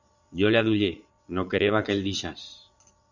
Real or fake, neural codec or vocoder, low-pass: fake; vocoder, 22.05 kHz, 80 mel bands, Vocos; 7.2 kHz